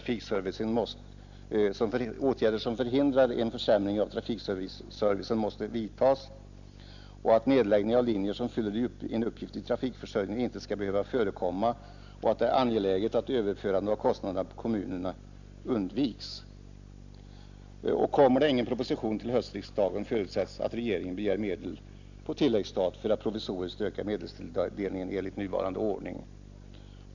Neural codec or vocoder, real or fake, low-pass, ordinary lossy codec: none; real; 7.2 kHz; none